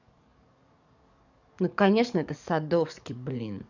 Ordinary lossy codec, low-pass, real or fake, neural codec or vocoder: none; 7.2 kHz; fake; codec, 44.1 kHz, 7.8 kbps, DAC